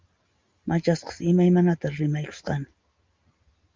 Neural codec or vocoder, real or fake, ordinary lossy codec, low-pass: none; real; Opus, 32 kbps; 7.2 kHz